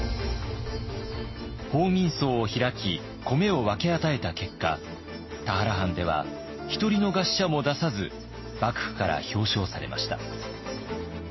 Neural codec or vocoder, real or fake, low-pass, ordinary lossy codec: none; real; 7.2 kHz; MP3, 24 kbps